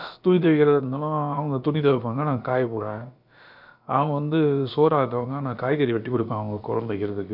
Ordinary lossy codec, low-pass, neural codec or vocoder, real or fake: none; 5.4 kHz; codec, 16 kHz, about 1 kbps, DyCAST, with the encoder's durations; fake